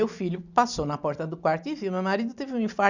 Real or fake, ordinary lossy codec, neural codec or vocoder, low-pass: real; none; none; 7.2 kHz